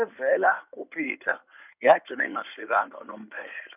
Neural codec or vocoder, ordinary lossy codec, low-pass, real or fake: codec, 16 kHz in and 24 kHz out, 2.2 kbps, FireRedTTS-2 codec; none; 3.6 kHz; fake